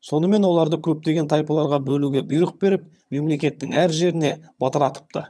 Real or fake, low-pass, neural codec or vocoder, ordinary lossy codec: fake; none; vocoder, 22.05 kHz, 80 mel bands, HiFi-GAN; none